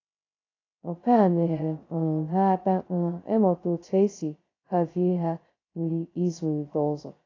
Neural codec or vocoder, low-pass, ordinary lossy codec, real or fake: codec, 16 kHz, 0.2 kbps, FocalCodec; 7.2 kHz; AAC, 32 kbps; fake